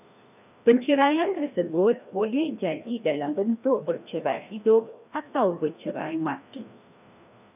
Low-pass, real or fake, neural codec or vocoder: 3.6 kHz; fake; codec, 16 kHz, 1 kbps, FreqCodec, larger model